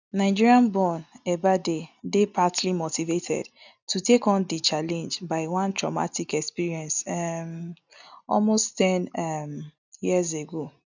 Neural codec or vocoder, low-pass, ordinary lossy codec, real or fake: none; 7.2 kHz; none; real